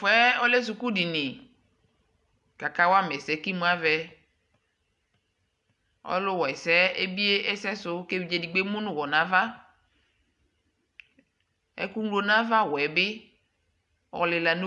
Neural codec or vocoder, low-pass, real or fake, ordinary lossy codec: none; 10.8 kHz; real; AAC, 96 kbps